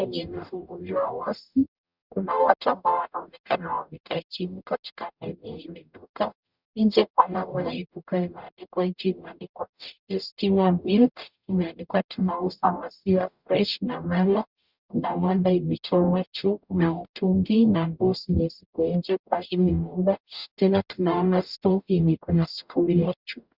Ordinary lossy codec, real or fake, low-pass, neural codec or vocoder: Opus, 64 kbps; fake; 5.4 kHz; codec, 44.1 kHz, 0.9 kbps, DAC